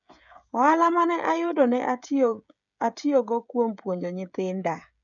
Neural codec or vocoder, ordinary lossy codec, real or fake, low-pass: codec, 16 kHz, 16 kbps, FreqCodec, smaller model; none; fake; 7.2 kHz